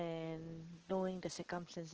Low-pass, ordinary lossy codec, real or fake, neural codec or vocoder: 7.2 kHz; Opus, 16 kbps; real; none